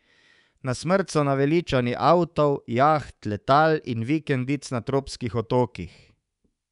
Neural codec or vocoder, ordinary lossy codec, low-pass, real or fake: codec, 24 kHz, 3.1 kbps, DualCodec; none; 10.8 kHz; fake